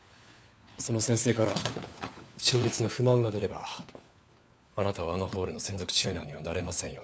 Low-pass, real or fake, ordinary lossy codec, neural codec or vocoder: none; fake; none; codec, 16 kHz, 4 kbps, FunCodec, trained on LibriTTS, 50 frames a second